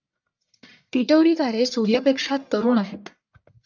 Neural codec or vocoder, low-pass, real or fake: codec, 44.1 kHz, 1.7 kbps, Pupu-Codec; 7.2 kHz; fake